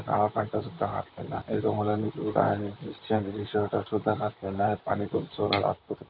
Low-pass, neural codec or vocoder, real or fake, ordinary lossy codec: 5.4 kHz; vocoder, 44.1 kHz, 128 mel bands, Pupu-Vocoder; fake; none